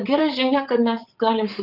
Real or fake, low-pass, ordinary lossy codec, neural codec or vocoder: fake; 5.4 kHz; Opus, 24 kbps; vocoder, 44.1 kHz, 80 mel bands, Vocos